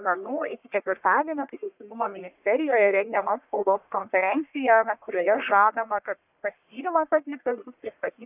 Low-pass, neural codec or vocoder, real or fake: 3.6 kHz; codec, 44.1 kHz, 1.7 kbps, Pupu-Codec; fake